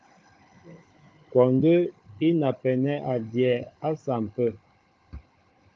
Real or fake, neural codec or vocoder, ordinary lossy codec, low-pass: fake; codec, 16 kHz, 16 kbps, FunCodec, trained on Chinese and English, 50 frames a second; Opus, 24 kbps; 7.2 kHz